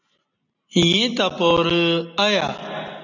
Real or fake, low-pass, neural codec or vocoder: real; 7.2 kHz; none